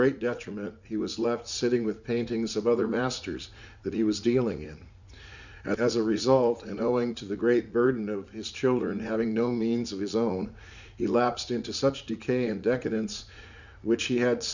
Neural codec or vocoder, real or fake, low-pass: vocoder, 44.1 kHz, 80 mel bands, Vocos; fake; 7.2 kHz